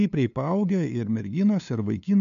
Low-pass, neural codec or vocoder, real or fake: 7.2 kHz; codec, 16 kHz, 2 kbps, FunCodec, trained on LibriTTS, 25 frames a second; fake